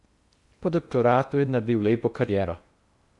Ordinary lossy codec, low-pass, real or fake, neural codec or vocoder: Opus, 64 kbps; 10.8 kHz; fake; codec, 16 kHz in and 24 kHz out, 0.6 kbps, FocalCodec, streaming, 2048 codes